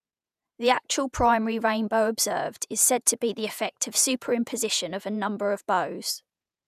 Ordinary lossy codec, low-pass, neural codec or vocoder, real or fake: none; 14.4 kHz; vocoder, 48 kHz, 128 mel bands, Vocos; fake